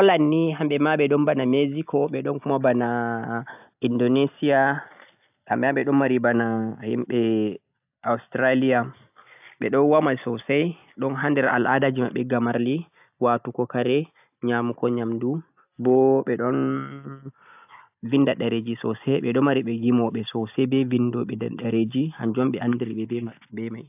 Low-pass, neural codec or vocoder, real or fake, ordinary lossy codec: 3.6 kHz; none; real; AAC, 32 kbps